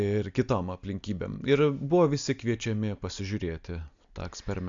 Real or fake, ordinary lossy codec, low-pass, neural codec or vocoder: real; MP3, 64 kbps; 7.2 kHz; none